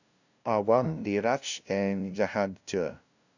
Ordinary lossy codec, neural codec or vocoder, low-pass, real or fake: none; codec, 16 kHz, 0.5 kbps, FunCodec, trained on LibriTTS, 25 frames a second; 7.2 kHz; fake